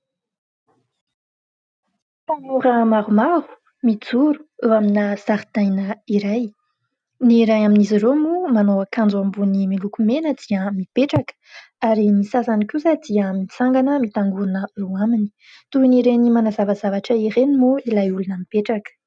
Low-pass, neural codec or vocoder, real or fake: 9.9 kHz; none; real